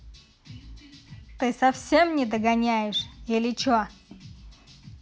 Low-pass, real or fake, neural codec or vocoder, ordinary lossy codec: none; real; none; none